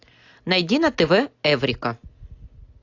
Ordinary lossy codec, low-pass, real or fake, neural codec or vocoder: AAC, 48 kbps; 7.2 kHz; real; none